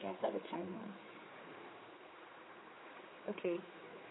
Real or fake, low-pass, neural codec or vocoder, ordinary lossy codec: fake; 7.2 kHz; codec, 16 kHz, 4 kbps, X-Codec, HuBERT features, trained on general audio; AAC, 16 kbps